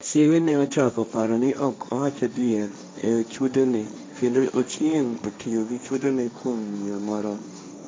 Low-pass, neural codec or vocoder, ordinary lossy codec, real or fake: none; codec, 16 kHz, 1.1 kbps, Voila-Tokenizer; none; fake